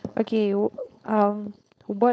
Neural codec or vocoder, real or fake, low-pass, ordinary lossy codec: codec, 16 kHz, 4.8 kbps, FACodec; fake; none; none